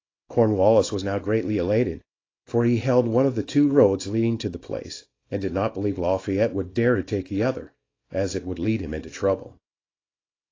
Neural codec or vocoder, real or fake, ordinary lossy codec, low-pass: codec, 24 kHz, 0.9 kbps, WavTokenizer, small release; fake; AAC, 32 kbps; 7.2 kHz